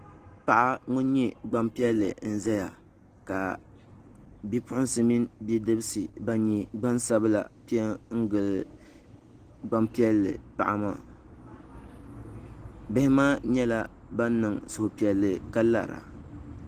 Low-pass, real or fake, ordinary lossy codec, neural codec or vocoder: 14.4 kHz; real; Opus, 16 kbps; none